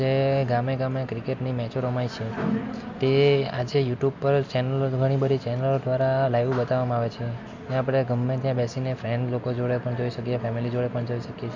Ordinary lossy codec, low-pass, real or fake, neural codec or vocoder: MP3, 64 kbps; 7.2 kHz; real; none